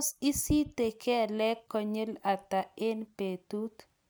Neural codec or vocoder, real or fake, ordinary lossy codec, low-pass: none; real; none; none